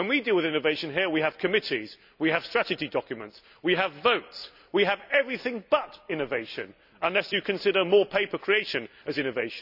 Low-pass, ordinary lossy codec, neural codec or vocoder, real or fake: 5.4 kHz; none; none; real